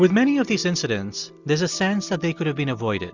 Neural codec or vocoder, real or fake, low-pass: none; real; 7.2 kHz